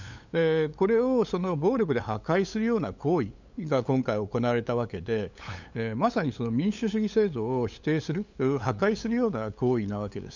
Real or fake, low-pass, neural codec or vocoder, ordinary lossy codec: fake; 7.2 kHz; codec, 16 kHz, 8 kbps, FunCodec, trained on LibriTTS, 25 frames a second; none